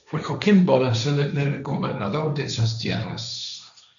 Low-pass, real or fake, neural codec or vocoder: 7.2 kHz; fake; codec, 16 kHz, 1.1 kbps, Voila-Tokenizer